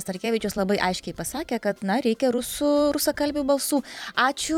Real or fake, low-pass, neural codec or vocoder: fake; 19.8 kHz; vocoder, 44.1 kHz, 128 mel bands every 256 samples, BigVGAN v2